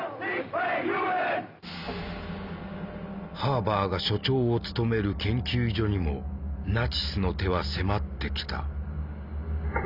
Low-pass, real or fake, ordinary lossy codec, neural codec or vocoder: 5.4 kHz; real; Opus, 64 kbps; none